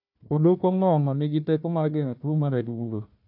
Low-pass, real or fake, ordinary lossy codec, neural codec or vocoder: 5.4 kHz; fake; none; codec, 16 kHz, 1 kbps, FunCodec, trained on Chinese and English, 50 frames a second